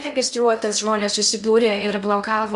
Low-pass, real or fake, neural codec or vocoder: 10.8 kHz; fake; codec, 16 kHz in and 24 kHz out, 0.6 kbps, FocalCodec, streaming, 2048 codes